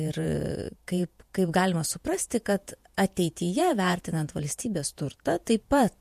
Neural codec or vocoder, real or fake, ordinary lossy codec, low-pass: vocoder, 48 kHz, 128 mel bands, Vocos; fake; MP3, 64 kbps; 14.4 kHz